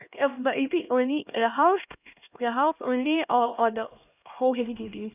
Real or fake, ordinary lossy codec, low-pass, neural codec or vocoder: fake; none; 3.6 kHz; codec, 16 kHz, 1 kbps, X-Codec, HuBERT features, trained on LibriSpeech